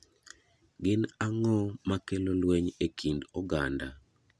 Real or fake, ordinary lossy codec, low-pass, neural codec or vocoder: real; none; none; none